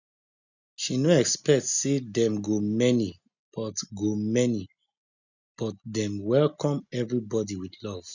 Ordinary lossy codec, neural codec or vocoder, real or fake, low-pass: none; none; real; 7.2 kHz